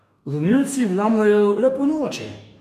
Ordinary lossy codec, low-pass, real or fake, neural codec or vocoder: none; 14.4 kHz; fake; codec, 44.1 kHz, 2.6 kbps, DAC